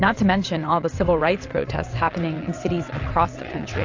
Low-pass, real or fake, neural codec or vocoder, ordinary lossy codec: 7.2 kHz; fake; vocoder, 22.05 kHz, 80 mel bands, WaveNeXt; AAC, 48 kbps